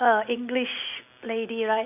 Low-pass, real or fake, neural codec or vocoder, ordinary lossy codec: 3.6 kHz; real; none; none